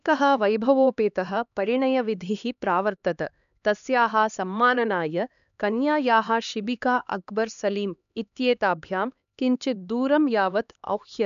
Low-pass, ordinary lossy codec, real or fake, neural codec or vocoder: 7.2 kHz; none; fake; codec, 16 kHz, 2 kbps, X-Codec, HuBERT features, trained on LibriSpeech